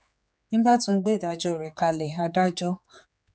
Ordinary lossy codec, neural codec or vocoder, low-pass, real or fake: none; codec, 16 kHz, 4 kbps, X-Codec, HuBERT features, trained on general audio; none; fake